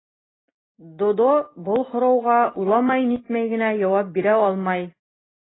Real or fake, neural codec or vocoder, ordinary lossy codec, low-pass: real; none; AAC, 16 kbps; 7.2 kHz